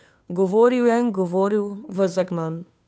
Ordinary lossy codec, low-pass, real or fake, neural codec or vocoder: none; none; fake; codec, 16 kHz, 2 kbps, FunCodec, trained on Chinese and English, 25 frames a second